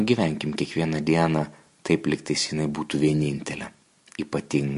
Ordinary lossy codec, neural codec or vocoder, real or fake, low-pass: MP3, 48 kbps; none; real; 14.4 kHz